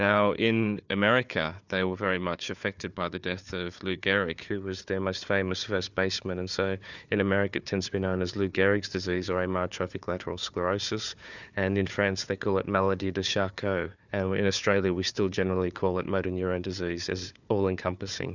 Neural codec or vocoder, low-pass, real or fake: codec, 16 kHz, 4 kbps, FunCodec, trained on Chinese and English, 50 frames a second; 7.2 kHz; fake